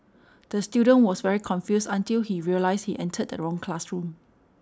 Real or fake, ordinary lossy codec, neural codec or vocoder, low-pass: real; none; none; none